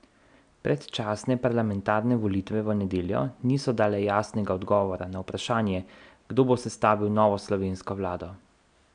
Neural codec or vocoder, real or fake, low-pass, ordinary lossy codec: none; real; 9.9 kHz; none